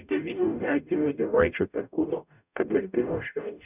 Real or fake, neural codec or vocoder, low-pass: fake; codec, 44.1 kHz, 0.9 kbps, DAC; 3.6 kHz